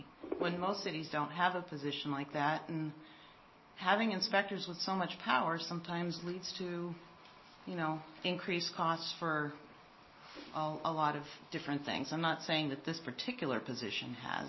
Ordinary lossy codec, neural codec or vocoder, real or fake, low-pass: MP3, 24 kbps; none; real; 7.2 kHz